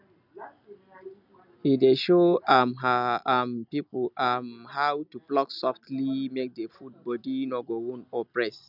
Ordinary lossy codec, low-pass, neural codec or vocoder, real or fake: none; 5.4 kHz; none; real